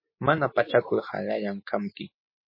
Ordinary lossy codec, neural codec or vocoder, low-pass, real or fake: MP3, 24 kbps; none; 5.4 kHz; real